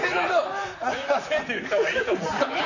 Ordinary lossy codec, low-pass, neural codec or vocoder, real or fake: none; 7.2 kHz; vocoder, 44.1 kHz, 128 mel bands, Pupu-Vocoder; fake